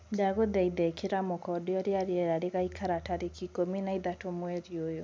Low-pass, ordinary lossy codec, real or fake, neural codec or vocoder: none; none; real; none